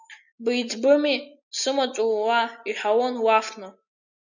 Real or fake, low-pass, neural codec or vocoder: real; 7.2 kHz; none